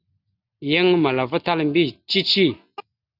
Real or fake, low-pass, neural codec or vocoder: real; 5.4 kHz; none